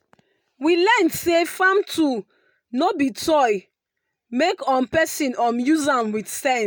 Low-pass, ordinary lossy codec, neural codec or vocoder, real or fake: none; none; none; real